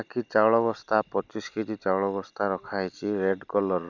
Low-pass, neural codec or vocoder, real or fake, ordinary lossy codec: 7.2 kHz; none; real; none